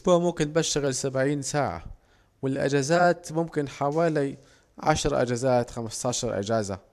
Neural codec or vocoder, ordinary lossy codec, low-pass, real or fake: vocoder, 44.1 kHz, 128 mel bands every 512 samples, BigVGAN v2; AAC, 96 kbps; 14.4 kHz; fake